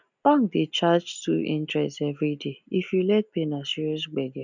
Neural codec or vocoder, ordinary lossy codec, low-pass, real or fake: none; none; 7.2 kHz; real